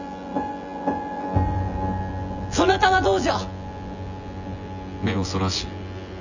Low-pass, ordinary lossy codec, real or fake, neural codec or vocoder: 7.2 kHz; none; fake; vocoder, 24 kHz, 100 mel bands, Vocos